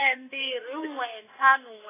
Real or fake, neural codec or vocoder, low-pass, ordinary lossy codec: fake; autoencoder, 48 kHz, 32 numbers a frame, DAC-VAE, trained on Japanese speech; 3.6 kHz; AAC, 16 kbps